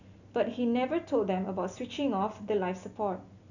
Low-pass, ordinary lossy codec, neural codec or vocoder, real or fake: 7.2 kHz; none; none; real